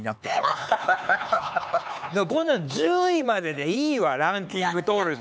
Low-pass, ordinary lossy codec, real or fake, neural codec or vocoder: none; none; fake; codec, 16 kHz, 4 kbps, X-Codec, HuBERT features, trained on LibriSpeech